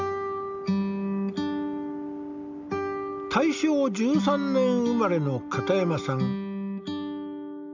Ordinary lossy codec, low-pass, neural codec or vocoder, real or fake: none; 7.2 kHz; none; real